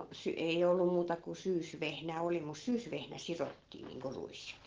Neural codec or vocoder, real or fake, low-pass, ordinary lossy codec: none; real; 7.2 kHz; Opus, 16 kbps